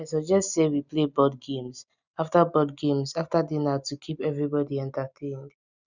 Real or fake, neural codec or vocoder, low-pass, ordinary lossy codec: real; none; 7.2 kHz; none